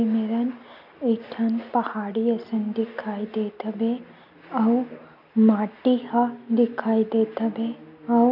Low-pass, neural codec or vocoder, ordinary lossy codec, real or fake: 5.4 kHz; none; none; real